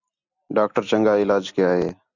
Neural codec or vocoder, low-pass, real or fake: none; 7.2 kHz; real